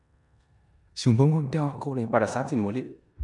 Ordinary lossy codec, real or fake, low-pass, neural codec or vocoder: none; fake; 10.8 kHz; codec, 16 kHz in and 24 kHz out, 0.9 kbps, LongCat-Audio-Codec, four codebook decoder